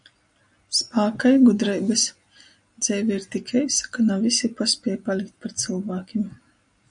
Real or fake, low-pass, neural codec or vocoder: real; 9.9 kHz; none